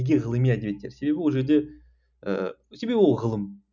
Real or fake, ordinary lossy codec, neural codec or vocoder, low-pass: real; none; none; 7.2 kHz